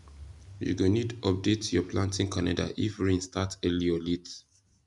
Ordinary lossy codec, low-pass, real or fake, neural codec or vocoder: none; 10.8 kHz; real; none